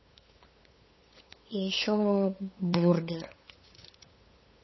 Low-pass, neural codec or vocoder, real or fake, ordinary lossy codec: 7.2 kHz; codec, 16 kHz, 8 kbps, FunCodec, trained on LibriTTS, 25 frames a second; fake; MP3, 24 kbps